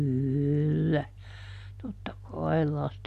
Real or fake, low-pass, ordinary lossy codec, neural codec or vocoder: real; 14.4 kHz; MP3, 64 kbps; none